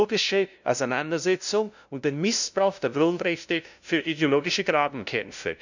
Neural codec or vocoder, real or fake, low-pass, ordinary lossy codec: codec, 16 kHz, 0.5 kbps, FunCodec, trained on LibriTTS, 25 frames a second; fake; 7.2 kHz; none